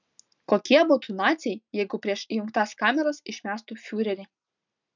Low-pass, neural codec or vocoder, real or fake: 7.2 kHz; none; real